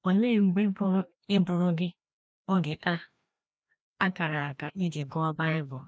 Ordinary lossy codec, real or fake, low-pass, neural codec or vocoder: none; fake; none; codec, 16 kHz, 1 kbps, FreqCodec, larger model